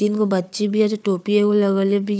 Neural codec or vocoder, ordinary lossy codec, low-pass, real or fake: codec, 16 kHz, 4 kbps, FunCodec, trained on Chinese and English, 50 frames a second; none; none; fake